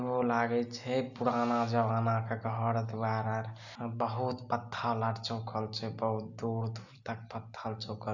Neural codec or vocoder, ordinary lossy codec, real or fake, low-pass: none; none; real; 7.2 kHz